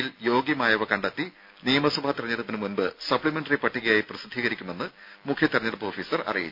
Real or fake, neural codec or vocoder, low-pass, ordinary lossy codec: real; none; 5.4 kHz; none